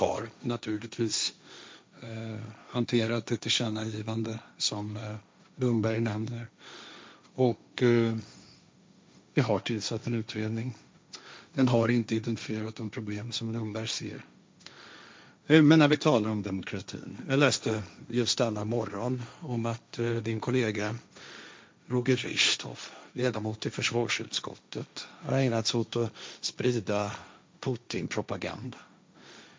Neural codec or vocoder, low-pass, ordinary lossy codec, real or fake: codec, 16 kHz, 1.1 kbps, Voila-Tokenizer; none; none; fake